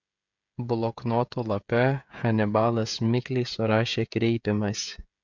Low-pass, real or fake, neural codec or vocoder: 7.2 kHz; fake; codec, 16 kHz, 16 kbps, FreqCodec, smaller model